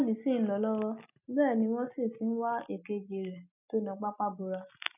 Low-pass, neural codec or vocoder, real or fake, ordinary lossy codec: 3.6 kHz; none; real; none